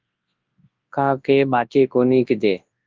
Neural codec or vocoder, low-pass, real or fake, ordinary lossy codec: codec, 24 kHz, 0.9 kbps, WavTokenizer, large speech release; 7.2 kHz; fake; Opus, 32 kbps